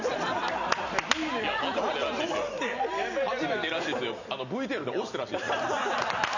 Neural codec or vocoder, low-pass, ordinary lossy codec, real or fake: none; 7.2 kHz; none; real